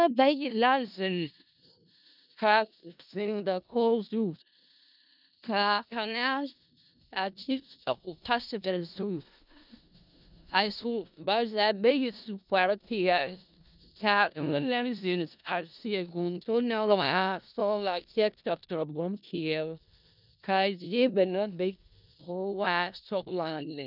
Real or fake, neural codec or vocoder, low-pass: fake; codec, 16 kHz in and 24 kHz out, 0.4 kbps, LongCat-Audio-Codec, four codebook decoder; 5.4 kHz